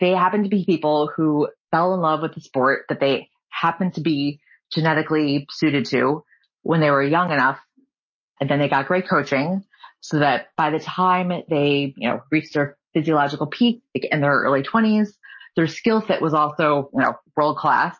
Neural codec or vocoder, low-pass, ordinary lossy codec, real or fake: none; 7.2 kHz; MP3, 32 kbps; real